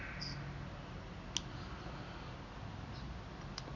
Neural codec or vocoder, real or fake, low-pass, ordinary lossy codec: none; real; 7.2 kHz; none